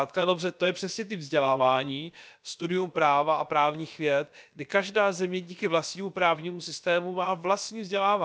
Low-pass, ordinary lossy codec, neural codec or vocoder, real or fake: none; none; codec, 16 kHz, about 1 kbps, DyCAST, with the encoder's durations; fake